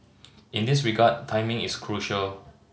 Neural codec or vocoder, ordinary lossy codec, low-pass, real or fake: none; none; none; real